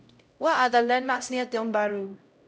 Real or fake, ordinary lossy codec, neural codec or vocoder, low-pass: fake; none; codec, 16 kHz, 0.5 kbps, X-Codec, HuBERT features, trained on LibriSpeech; none